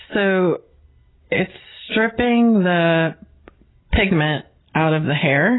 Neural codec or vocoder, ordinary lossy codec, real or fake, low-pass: none; AAC, 16 kbps; real; 7.2 kHz